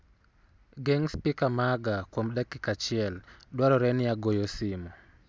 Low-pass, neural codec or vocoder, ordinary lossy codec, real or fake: none; none; none; real